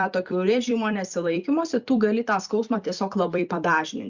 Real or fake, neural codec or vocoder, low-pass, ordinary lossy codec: fake; vocoder, 44.1 kHz, 128 mel bands every 256 samples, BigVGAN v2; 7.2 kHz; Opus, 64 kbps